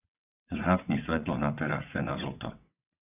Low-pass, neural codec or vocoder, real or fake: 3.6 kHz; vocoder, 22.05 kHz, 80 mel bands, WaveNeXt; fake